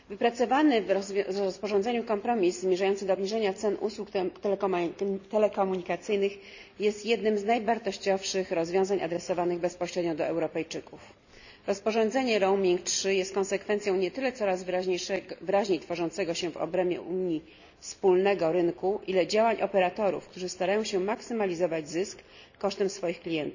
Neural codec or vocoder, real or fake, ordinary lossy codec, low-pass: none; real; none; 7.2 kHz